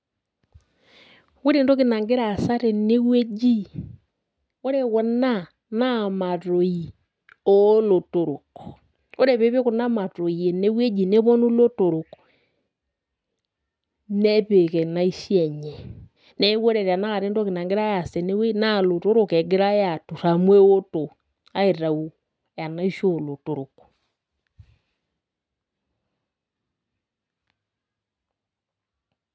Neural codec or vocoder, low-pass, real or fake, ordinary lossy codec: none; none; real; none